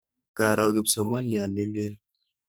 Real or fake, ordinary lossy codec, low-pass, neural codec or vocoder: fake; none; none; codec, 44.1 kHz, 2.6 kbps, SNAC